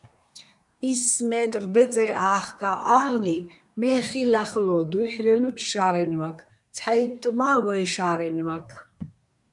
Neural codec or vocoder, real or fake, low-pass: codec, 24 kHz, 1 kbps, SNAC; fake; 10.8 kHz